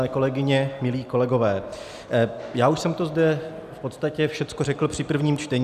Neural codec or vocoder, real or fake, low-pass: none; real; 14.4 kHz